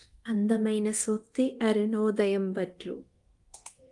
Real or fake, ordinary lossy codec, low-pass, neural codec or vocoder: fake; Opus, 24 kbps; 10.8 kHz; codec, 24 kHz, 0.9 kbps, DualCodec